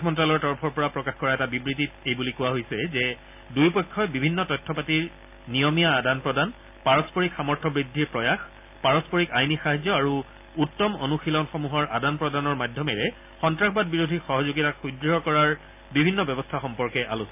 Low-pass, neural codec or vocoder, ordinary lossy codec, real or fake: 3.6 kHz; none; AAC, 32 kbps; real